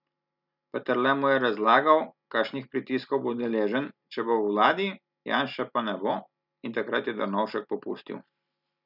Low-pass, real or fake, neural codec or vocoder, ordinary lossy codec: 5.4 kHz; real; none; none